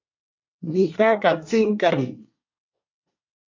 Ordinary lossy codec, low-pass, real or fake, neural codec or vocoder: MP3, 48 kbps; 7.2 kHz; fake; codec, 24 kHz, 1 kbps, SNAC